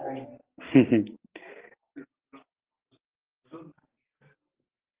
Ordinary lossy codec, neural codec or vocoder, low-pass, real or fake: Opus, 16 kbps; none; 3.6 kHz; real